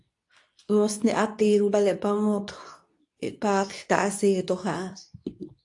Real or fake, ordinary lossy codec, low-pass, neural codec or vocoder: fake; AAC, 64 kbps; 10.8 kHz; codec, 24 kHz, 0.9 kbps, WavTokenizer, medium speech release version 2